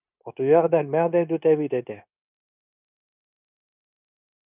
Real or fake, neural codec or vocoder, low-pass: fake; codec, 16 kHz, 0.9 kbps, LongCat-Audio-Codec; 3.6 kHz